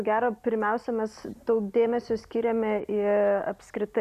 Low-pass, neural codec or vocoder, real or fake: 14.4 kHz; none; real